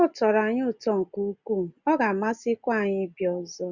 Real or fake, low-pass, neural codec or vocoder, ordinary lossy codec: real; 7.2 kHz; none; Opus, 64 kbps